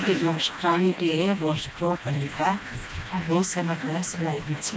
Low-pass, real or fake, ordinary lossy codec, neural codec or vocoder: none; fake; none; codec, 16 kHz, 1 kbps, FreqCodec, smaller model